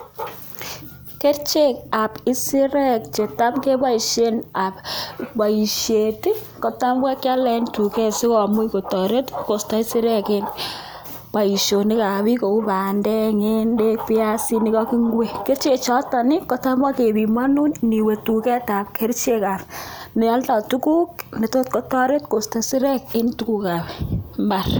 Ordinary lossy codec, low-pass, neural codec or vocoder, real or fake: none; none; none; real